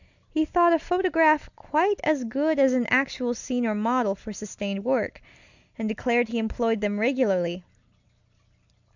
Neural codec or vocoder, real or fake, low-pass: none; real; 7.2 kHz